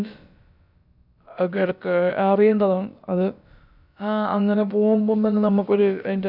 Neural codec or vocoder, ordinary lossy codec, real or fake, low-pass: codec, 16 kHz, about 1 kbps, DyCAST, with the encoder's durations; none; fake; 5.4 kHz